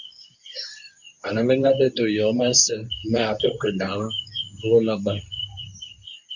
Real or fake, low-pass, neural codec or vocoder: fake; 7.2 kHz; codec, 24 kHz, 0.9 kbps, WavTokenizer, medium speech release version 2